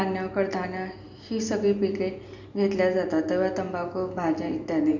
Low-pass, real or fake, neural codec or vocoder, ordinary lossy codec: 7.2 kHz; real; none; none